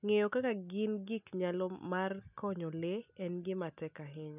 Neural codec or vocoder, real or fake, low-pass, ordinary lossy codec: none; real; 3.6 kHz; none